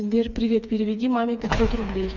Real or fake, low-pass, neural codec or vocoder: fake; 7.2 kHz; codec, 16 kHz, 4 kbps, FreqCodec, smaller model